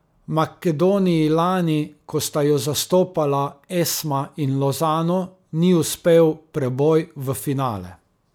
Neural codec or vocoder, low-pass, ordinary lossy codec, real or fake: none; none; none; real